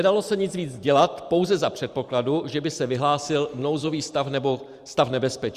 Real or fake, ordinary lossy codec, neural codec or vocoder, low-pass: fake; Opus, 64 kbps; vocoder, 44.1 kHz, 128 mel bands every 512 samples, BigVGAN v2; 14.4 kHz